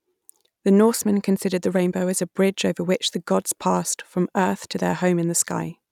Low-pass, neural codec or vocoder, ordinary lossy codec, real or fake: 19.8 kHz; none; none; real